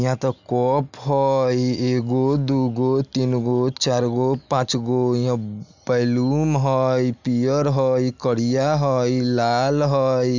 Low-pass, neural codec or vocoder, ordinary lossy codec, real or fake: 7.2 kHz; none; none; real